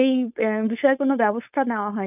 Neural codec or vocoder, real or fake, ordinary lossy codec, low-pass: codec, 16 kHz, 4.8 kbps, FACodec; fake; none; 3.6 kHz